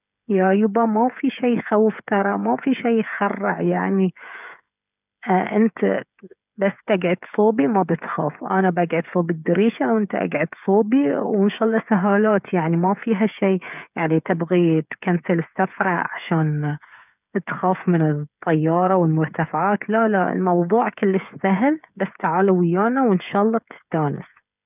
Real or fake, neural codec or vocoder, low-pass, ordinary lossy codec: fake; codec, 16 kHz, 8 kbps, FreqCodec, smaller model; 3.6 kHz; none